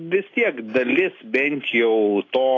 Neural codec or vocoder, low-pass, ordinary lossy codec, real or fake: none; 7.2 kHz; AAC, 32 kbps; real